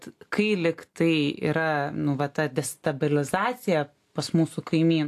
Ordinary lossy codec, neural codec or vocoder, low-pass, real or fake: AAC, 64 kbps; none; 14.4 kHz; real